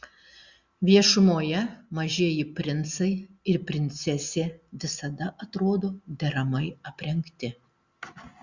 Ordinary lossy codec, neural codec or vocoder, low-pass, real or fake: Opus, 64 kbps; none; 7.2 kHz; real